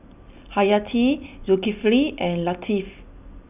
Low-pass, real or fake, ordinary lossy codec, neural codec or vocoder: 3.6 kHz; real; none; none